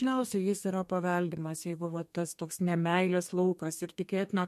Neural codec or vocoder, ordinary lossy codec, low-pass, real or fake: codec, 32 kHz, 1.9 kbps, SNAC; MP3, 64 kbps; 14.4 kHz; fake